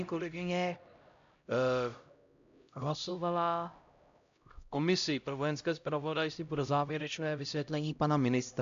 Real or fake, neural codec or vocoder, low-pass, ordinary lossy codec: fake; codec, 16 kHz, 0.5 kbps, X-Codec, HuBERT features, trained on LibriSpeech; 7.2 kHz; MP3, 64 kbps